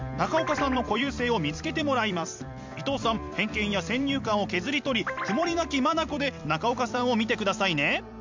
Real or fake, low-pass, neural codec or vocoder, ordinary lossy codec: fake; 7.2 kHz; vocoder, 44.1 kHz, 128 mel bands every 256 samples, BigVGAN v2; MP3, 64 kbps